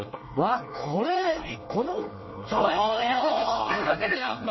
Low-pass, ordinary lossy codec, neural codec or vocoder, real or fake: 7.2 kHz; MP3, 24 kbps; codec, 24 kHz, 1 kbps, SNAC; fake